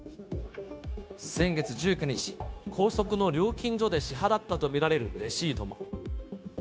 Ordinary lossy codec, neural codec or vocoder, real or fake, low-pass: none; codec, 16 kHz, 0.9 kbps, LongCat-Audio-Codec; fake; none